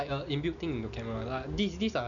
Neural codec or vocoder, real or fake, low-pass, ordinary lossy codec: none; real; 7.2 kHz; none